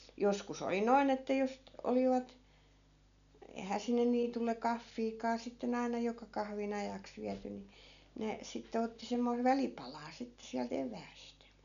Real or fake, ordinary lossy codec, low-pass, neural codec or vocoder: real; none; 7.2 kHz; none